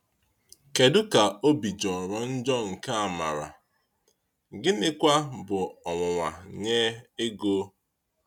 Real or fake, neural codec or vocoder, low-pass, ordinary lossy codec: real; none; 19.8 kHz; none